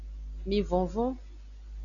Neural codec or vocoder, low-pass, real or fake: none; 7.2 kHz; real